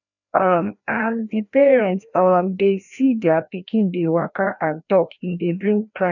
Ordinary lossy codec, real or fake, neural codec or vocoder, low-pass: none; fake; codec, 16 kHz, 1 kbps, FreqCodec, larger model; 7.2 kHz